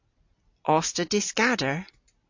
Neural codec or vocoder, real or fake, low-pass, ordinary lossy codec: none; real; 7.2 kHz; AAC, 48 kbps